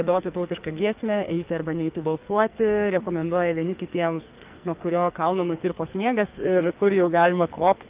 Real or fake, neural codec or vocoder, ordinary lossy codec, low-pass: fake; codec, 44.1 kHz, 2.6 kbps, SNAC; Opus, 24 kbps; 3.6 kHz